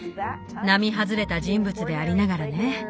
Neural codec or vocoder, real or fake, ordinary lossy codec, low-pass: none; real; none; none